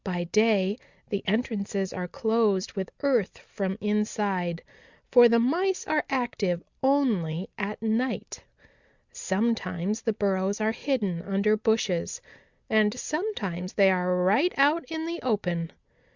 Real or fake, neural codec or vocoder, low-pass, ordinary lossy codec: real; none; 7.2 kHz; Opus, 64 kbps